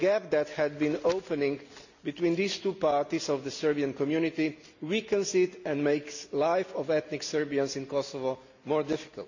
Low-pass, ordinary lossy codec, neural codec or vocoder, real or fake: 7.2 kHz; none; none; real